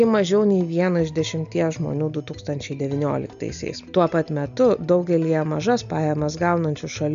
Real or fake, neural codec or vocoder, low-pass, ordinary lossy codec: real; none; 7.2 kHz; AAC, 96 kbps